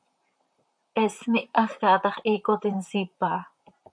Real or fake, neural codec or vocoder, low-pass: fake; vocoder, 44.1 kHz, 128 mel bands, Pupu-Vocoder; 9.9 kHz